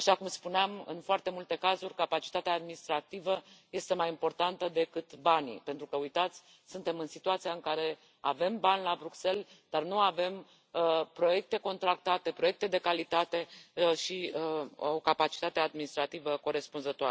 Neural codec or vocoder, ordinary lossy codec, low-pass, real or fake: none; none; none; real